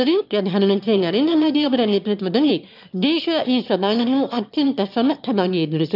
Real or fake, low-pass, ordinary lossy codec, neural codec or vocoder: fake; 5.4 kHz; none; autoencoder, 22.05 kHz, a latent of 192 numbers a frame, VITS, trained on one speaker